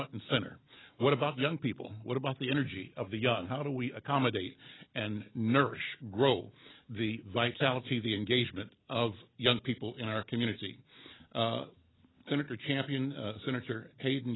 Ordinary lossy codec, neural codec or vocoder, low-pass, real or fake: AAC, 16 kbps; none; 7.2 kHz; real